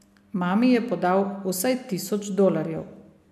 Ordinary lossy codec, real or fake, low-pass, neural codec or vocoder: MP3, 96 kbps; real; 14.4 kHz; none